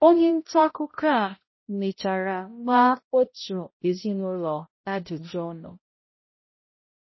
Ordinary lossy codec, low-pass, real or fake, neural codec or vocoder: MP3, 24 kbps; 7.2 kHz; fake; codec, 16 kHz, 0.5 kbps, X-Codec, HuBERT features, trained on balanced general audio